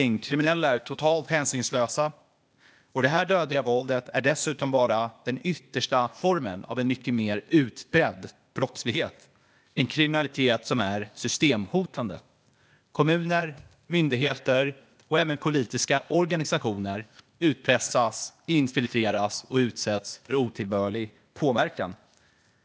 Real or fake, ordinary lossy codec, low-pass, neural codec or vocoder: fake; none; none; codec, 16 kHz, 0.8 kbps, ZipCodec